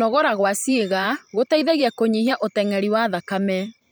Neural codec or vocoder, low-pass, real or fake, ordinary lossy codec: vocoder, 44.1 kHz, 128 mel bands every 512 samples, BigVGAN v2; none; fake; none